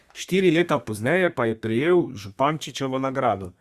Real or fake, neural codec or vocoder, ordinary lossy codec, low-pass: fake; codec, 32 kHz, 1.9 kbps, SNAC; Opus, 64 kbps; 14.4 kHz